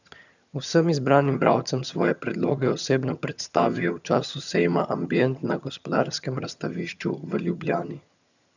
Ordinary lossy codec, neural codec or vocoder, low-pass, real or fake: none; vocoder, 22.05 kHz, 80 mel bands, HiFi-GAN; 7.2 kHz; fake